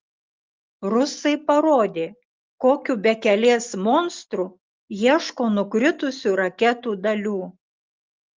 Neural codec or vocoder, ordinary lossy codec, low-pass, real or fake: none; Opus, 32 kbps; 7.2 kHz; real